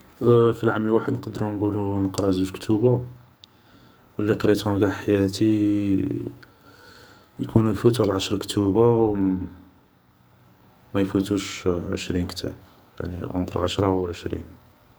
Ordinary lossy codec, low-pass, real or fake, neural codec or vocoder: none; none; fake; codec, 44.1 kHz, 2.6 kbps, SNAC